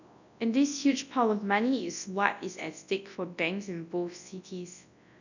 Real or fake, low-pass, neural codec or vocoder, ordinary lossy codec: fake; 7.2 kHz; codec, 24 kHz, 0.9 kbps, WavTokenizer, large speech release; none